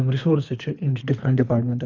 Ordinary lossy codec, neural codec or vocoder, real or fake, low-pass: none; codec, 24 kHz, 3 kbps, HILCodec; fake; 7.2 kHz